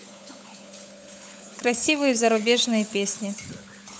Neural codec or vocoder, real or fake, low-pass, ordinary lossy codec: codec, 16 kHz, 16 kbps, FunCodec, trained on LibriTTS, 50 frames a second; fake; none; none